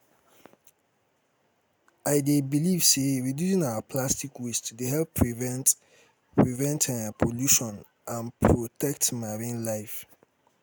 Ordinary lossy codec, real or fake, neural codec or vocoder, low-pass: none; real; none; none